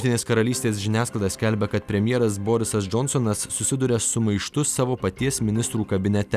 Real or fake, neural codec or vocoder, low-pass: real; none; 14.4 kHz